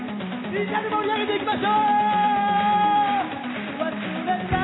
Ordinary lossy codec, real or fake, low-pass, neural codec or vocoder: AAC, 16 kbps; real; 7.2 kHz; none